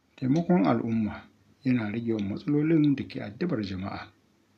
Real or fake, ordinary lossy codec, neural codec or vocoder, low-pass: real; none; none; 14.4 kHz